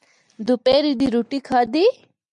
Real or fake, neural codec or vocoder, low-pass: real; none; 10.8 kHz